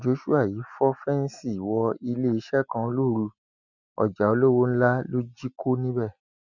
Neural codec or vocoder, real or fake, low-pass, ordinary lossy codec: none; real; 7.2 kHz; none